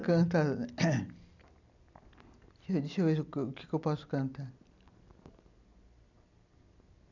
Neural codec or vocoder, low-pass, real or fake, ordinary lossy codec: none; 7.2 kHz; real; none